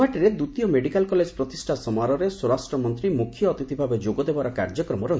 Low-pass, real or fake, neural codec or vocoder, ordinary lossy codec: none; real; none; none